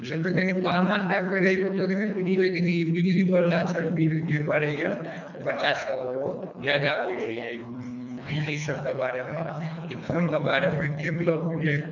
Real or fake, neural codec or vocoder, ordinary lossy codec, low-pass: fake; codec, 24 kHz, 1.5 kbps, HILCodec; none; 7.2 kHz